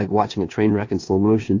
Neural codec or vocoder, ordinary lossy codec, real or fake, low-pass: codec, 16 kHz in and 24 kHz out, 0.9 kbps, LongCat-Audio-Codec, four codebook decoder; AAC, 48 kbps; fake; 7.2 kHz